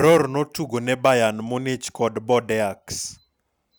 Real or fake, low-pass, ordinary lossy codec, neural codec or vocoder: real; none; none; none